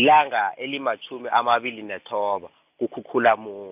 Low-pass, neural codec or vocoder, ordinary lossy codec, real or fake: 3.6 kHz; none; AAC, 32 kbps; real